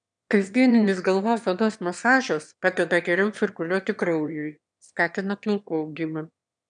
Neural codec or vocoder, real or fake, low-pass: autoencoder, 22.05 kHz, a latent of 192 numbers a frame, VITS, trained on one speaker; fake; 9.9 kHz